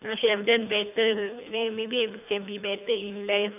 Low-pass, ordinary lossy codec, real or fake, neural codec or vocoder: 3.6 kHz; none; fake; codec, 24 kHz, 3 kbps, HILCodec